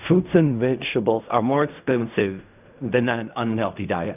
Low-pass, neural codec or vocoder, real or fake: 3.6 kHz; codec, 16 kHz in and 24 kHz out, 0.4 kbps, LongCat-Audio-Codec, fine tuned four codebook decoder; fake